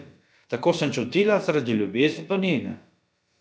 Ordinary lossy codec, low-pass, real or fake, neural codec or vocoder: none; none; fake; codec, 16 kHz, about 1 kbps, DyCAST, with the encoder's durations